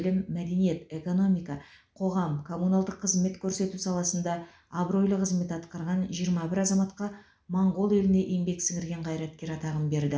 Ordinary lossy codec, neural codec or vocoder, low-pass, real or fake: none; none; none; real